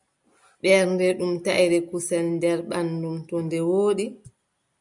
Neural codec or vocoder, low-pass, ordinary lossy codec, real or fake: none; 10.8 kHz; MP3, 96 kbps; real